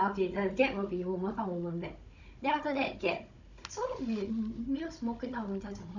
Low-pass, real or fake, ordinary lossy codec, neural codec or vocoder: 7.2 kHz; fake; none; codec, 16 kHz, 16 kbps, FunCodec, trained on Chinese and English, 50 frames a second